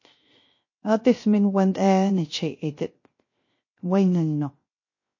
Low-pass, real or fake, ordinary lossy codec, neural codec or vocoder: 7.2 kHz; fake; MP3, 32 kbps; codec, 16 kHz, 0.3 kbps, FocalCodec